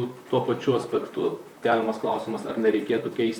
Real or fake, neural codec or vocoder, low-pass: fake; vocoder, 44.1 kHz, 128 mel bands, Pupu-Vocoder; 19.8 kHz